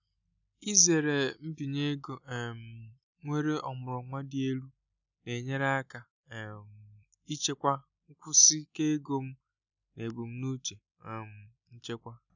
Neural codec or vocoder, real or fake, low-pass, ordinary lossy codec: none; real; 7.2 kHz; none